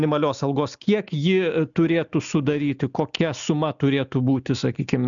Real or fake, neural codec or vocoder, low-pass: real; none; 7.2 kHz